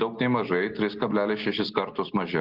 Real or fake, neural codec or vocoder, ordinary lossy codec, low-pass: real; none; Opus, 16 kbps; 5.4 kHz